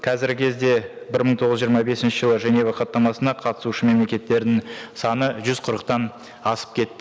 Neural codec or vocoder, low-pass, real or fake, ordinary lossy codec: none; none; real; none